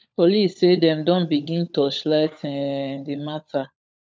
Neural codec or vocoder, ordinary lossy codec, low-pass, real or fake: codec, 16 kHz, 16 kbps, FunCodec, trained on LibriTTS, 50 frames a second; none; none; fake